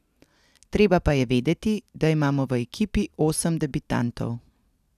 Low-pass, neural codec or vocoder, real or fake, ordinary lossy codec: 14.4 kHz; none; real; none